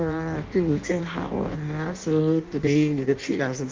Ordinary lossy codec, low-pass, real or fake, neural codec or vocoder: Opus, 32 kbps; 7.2 kHz; fake; codec, 16 kHz in and 24 kHz out, 0.6 kbps, FireRedTTS-2 codec